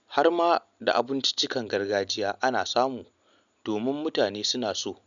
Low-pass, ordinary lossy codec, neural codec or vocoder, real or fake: 7.2 kHz; none; none; real